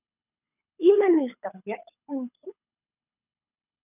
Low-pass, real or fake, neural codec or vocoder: 3.6 kHz; fake; codec, 24 kHz, 6 kbps, HILCodec